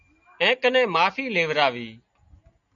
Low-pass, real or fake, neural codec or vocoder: 7.2 kHz; real; none